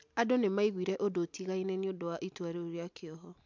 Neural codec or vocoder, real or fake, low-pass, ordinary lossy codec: none; real; 7.2 kHz; MP3, 64 kbps